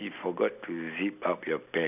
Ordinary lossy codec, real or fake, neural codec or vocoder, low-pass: none; real; none; 3.6 kHz